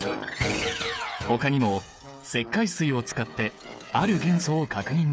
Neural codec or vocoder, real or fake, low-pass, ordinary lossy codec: codec, 16 kHz, 8 kbps, FreqCodec, smaller model; fake; none; none